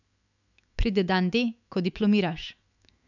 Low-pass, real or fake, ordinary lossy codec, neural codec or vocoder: 7.2 kHz; fake; none; autoencoder, 48 kHz, 128 numbers a frame, DAC-VAE, trained on Japanese speech